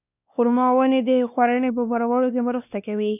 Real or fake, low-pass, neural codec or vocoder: fake; 3.6 kHz; codec, 16 kHz, 2 kbps, X-Codec, WavLM features, trained on Multilingual LibriSpeech